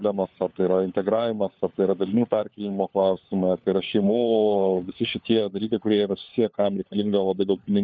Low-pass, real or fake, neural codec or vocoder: 7.2 kHz; fake; codec, 16 kHz, 4 kbps, FunCodec, trained on LibriTTS, 50 frames a second